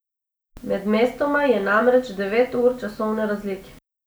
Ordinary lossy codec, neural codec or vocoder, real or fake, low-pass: none; none; real; none